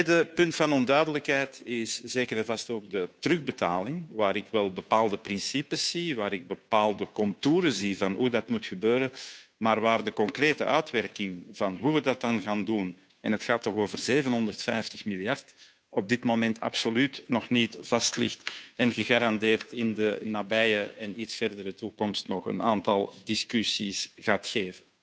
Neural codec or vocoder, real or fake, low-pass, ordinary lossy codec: codec, 16 kHz, 2 kbps, FunCodec, trained on Chinese and English, 25 frames a second; fake; none; none